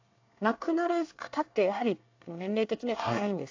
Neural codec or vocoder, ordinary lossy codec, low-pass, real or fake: codec, 24 kHz, 1 kbps, SNAC; AAC, 48 kbps; 7.2 kHz; fake